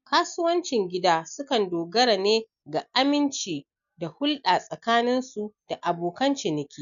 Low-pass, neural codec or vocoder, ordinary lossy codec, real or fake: 7.2 kHz; none; none; real